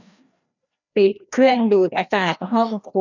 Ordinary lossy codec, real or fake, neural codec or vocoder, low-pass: none; fake; codec, 16 kHz, 1 kbps, FreqCodec, larger model; 7.2 kHz